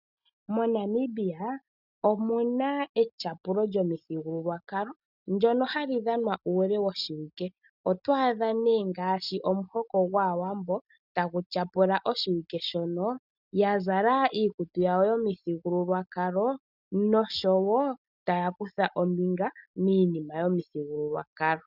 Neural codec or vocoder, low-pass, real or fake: none; 5.4 kHz; real